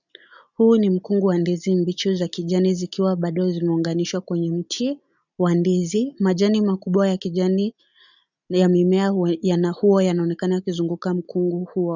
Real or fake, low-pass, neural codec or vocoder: real; 7.2 kHz; none